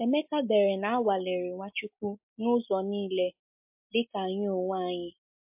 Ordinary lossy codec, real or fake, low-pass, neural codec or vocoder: MP3, 32 kbps; real; 3.6 kHz; none